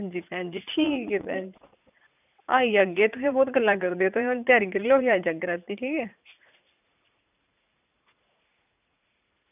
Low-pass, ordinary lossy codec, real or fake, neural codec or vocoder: 3.6 kHz; none; fake; vocoder, 44.1 kHz, 80 mel bands, Vocos